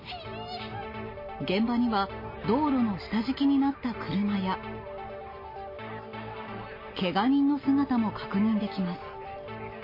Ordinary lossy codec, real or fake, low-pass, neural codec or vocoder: MP3, 24 kbps; real; 5.4 kHz; none